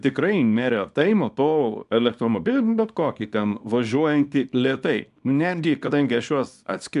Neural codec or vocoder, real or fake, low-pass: codec, 24 kHz, 0.9 kbps, WavTokenizer, small release; fake; 10.8 kHz